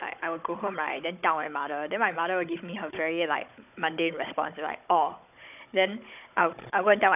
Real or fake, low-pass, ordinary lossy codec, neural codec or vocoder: fake; 3.6 kHz; none; codec, 16 kHz, 16 kbps, FunCodec, trained on Chinese and English, 50 frames a second